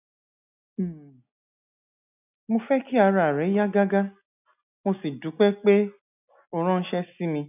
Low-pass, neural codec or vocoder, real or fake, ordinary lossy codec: 3.6 kHz; none; real; none